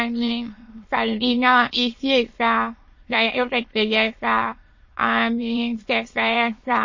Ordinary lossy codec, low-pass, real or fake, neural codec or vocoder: MP3, 32 kbps; 7.2 kHz; fake; autoencoder, 22.05 kHz, a latent of 192 numbers a frame, VITS, trained on many speakers